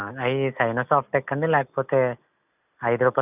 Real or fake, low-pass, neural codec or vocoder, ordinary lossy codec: real; 3.6 kHz; none; none